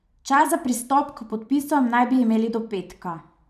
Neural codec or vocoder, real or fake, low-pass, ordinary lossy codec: vocoder, 44.1 kHz, 128 mel bands every 256 samples, BigVGAN v2; fake; 14.4 kHz; none